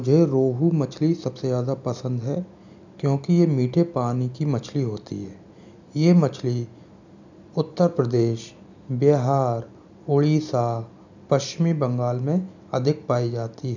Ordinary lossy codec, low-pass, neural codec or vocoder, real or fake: none; 7.2 kHz; none; real